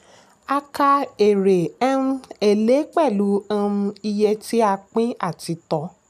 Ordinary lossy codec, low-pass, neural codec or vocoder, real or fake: none; 14.4 kHz; none; real